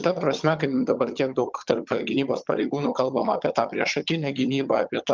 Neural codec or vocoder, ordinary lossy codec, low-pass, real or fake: vocoder, 22.05 kHz, 80 mel bands, HiFi-GAN; Opus, 24 kbps; 7.2 kHz; fake